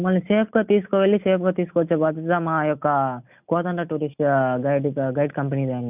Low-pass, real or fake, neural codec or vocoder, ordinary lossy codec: 3.6 kHz; real; none; none